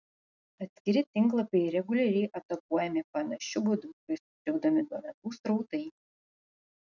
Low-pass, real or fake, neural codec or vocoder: 7.2 kHz; real; none